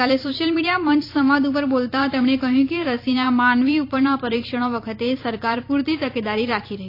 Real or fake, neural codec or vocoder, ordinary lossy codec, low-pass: fake; vocoder, 44.1 kHz, 128 mel bands every 256 samples, BigVGAN v2; AAC, 32 kbps; 5.4 kHz